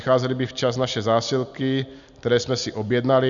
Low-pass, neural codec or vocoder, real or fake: 7.2 kHz; none; real